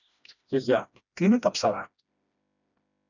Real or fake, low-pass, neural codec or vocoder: fake; 7.2 kHz; codec, 16 kHz, 1 kbps, FreqCodec, smaller model